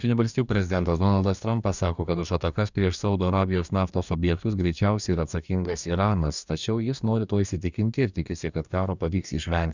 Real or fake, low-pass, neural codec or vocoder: fake; 7.2 kHz; codec, 44.1 kHz, 2.6 kbps, DAC